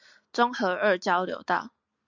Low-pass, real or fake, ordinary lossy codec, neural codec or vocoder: 7.2 kHz; real; MP3, 64 kbps; none